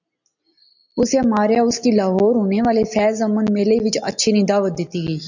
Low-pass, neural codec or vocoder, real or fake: 7.2 kHz; none; real